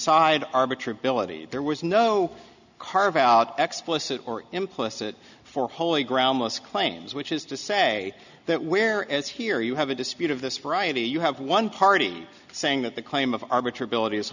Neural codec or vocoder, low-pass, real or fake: none; 7.2 kHz; real